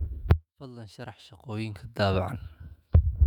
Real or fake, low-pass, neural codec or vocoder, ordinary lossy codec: fake; 19.8 kHz; autoencoder, 48 kHz, 128 numbers a frame, DAC-VAE, trained on Japanese speech; none